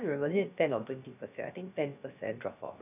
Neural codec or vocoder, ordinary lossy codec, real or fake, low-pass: codec, 16 kHz, about 1 kbps, DyCAST, with the encoder's durations; none; fake; 3.6 kHz